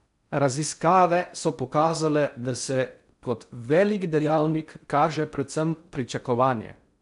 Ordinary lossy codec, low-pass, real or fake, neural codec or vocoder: none; 10.8 kHz; fake; codec, 16 kHz in and 24 kHz out, 0.6 kbps, FocalCodec, streaming, 4096 codes